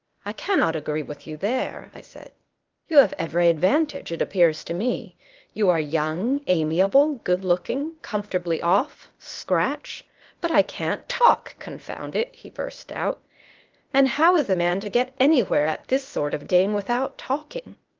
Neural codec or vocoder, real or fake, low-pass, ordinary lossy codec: codec, 16 kHz, 0.8 kbps, ZipCodec; fake; 7.2 kHz; Opus, 32 kbps